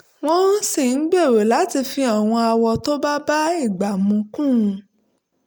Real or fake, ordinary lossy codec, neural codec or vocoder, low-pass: real; none; none; none